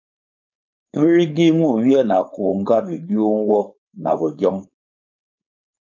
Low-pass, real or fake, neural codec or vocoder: 7.2 kHz; fake; codec, 16 kHz, 4.8 kbps, FACodec